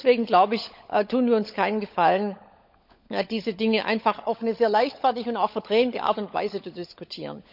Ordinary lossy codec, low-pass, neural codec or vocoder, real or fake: none; 5.4 kHz; codec, 16 kHz, 16 kbps, FunCodec, trained on LibriTTS, 50 frames a second; fake